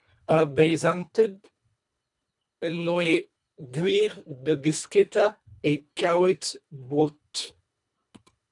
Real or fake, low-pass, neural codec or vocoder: fake; 10.8 kHz; codec, 24 kHz, 1.5 kbps, HILCodec